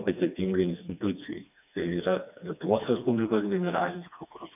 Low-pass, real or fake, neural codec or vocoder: 3.6 kHz; fake; codec, 16 kHz, 2 kbps, FreqCodec, smaller model